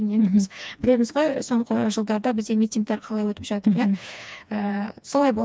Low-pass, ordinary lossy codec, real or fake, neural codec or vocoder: none; none; fake; codec, 16 kHz, 2 kbps, FreqCodec, smaller model